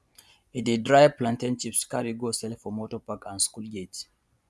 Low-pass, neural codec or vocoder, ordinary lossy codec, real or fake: none; vocoder, 24 kHz, 100 mel bands, Vocos; none; fake